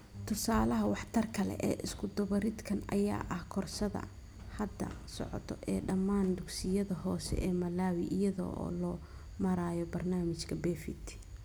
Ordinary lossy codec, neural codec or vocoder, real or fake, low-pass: none; none; real; none